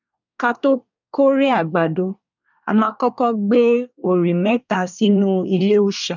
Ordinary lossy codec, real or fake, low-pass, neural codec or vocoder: none; fake; 7.2 kHz; codec, 24 kHz, 1 kbps, SNAC